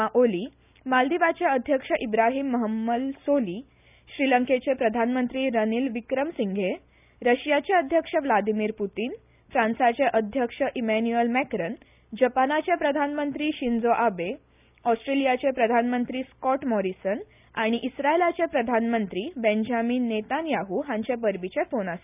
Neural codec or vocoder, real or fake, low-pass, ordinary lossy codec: none; real; 3.6 kHz; none